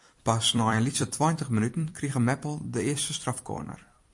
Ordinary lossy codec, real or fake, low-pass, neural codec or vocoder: MP3, 64 kbps; fake; 10.8 kHz; vocoder, 44.1 kHz, 128 mel bands every 256 samples, BigVGAN v2